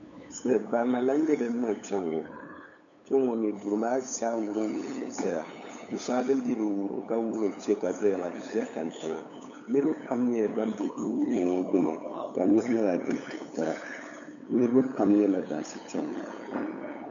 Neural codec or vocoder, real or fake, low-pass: codec, 16 kHz, 8 kbps, FunCodec, trained on LibriTTS, 25 frames a second; fake; 7.2 kHz